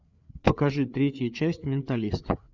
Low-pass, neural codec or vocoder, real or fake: 7.2 kHz; codec, 16 kHz, 4 kbps, FreqCodec, larger model; fake